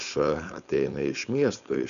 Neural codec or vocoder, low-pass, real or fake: codec, 16 kHz, 4.8 kbps, FACodec; 7.2 kHz; fake